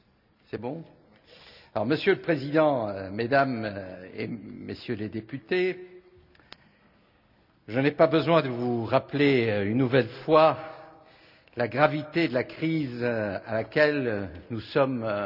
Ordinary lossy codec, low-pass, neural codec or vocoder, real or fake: none; 5.4 kHz; none; real